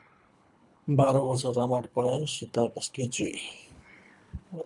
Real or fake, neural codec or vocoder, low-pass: fake; codec, 24 kHz, 3 kbps, HILCodec; 10.8 kHz